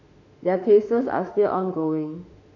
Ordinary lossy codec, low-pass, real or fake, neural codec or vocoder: none; 7.2 kHz; fake; autoencoder, 48 kHz, 32 numbers a frame, DAC-VAE, trained on Japanese speech